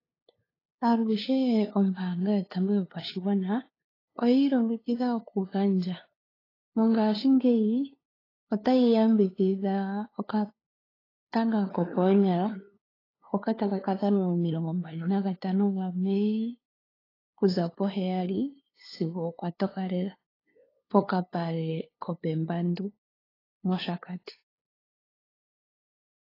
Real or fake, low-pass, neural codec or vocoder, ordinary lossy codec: fake; 5.4 kHz; codec, 16 kHz, 2 kbps, FunCodec, trained on LibriTTS, 25 frames a second; AAC, 24 kbps